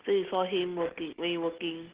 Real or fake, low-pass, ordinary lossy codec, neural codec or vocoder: real; 3.6 kHz; Opus, 16 kbps; none